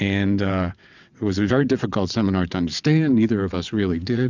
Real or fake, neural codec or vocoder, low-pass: fake; vocoder, 22.05 kHz, 80 mel bands, WaveNeXt; 7.2 kHz